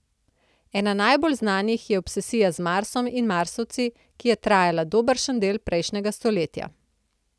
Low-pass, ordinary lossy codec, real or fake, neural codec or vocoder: none; none; real; none